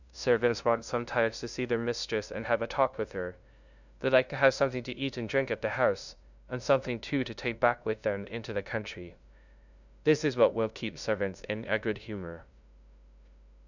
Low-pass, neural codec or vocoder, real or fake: 7.2 kHz; codec, 16 kHz, 0.5 kbps, FunCodec, trained on LibriTTS, 25 frames a second; fake